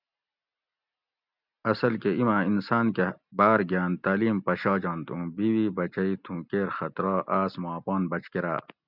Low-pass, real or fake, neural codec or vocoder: 5.4 kHz; real; none